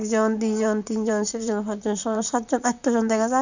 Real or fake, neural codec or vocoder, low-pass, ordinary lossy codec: fake; vocoder, 44.1 kHz, 128 mel bands, Pupu-Vocoder; 7.2 kHz; none